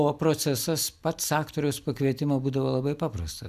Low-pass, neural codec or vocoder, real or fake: 14.4 kHz; none; real